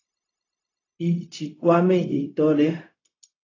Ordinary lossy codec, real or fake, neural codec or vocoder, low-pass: AAC, 32 kbps; fake; codec, 16 kHz, 0.4 kbps, LongCat-Audio-Codec; 7.2 kHz